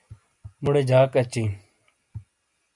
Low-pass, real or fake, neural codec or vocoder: 10.8 kHz; real; none